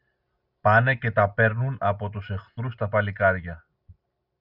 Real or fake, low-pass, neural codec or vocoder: real; 5.4 kHz; none